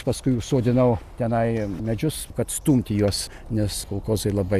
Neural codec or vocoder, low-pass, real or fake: none; 14.4 kHz; real